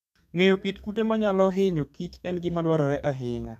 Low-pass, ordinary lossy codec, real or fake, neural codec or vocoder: 14.4 kHz; none; fake; codec, 32 kHz, 1.9 kbps, SNAC